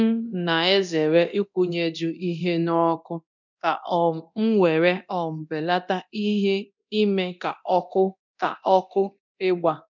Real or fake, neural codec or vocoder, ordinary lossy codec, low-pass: fake; codec, 24 kHz, 0.9 kbps, DualCodec; none; 7.2 kHz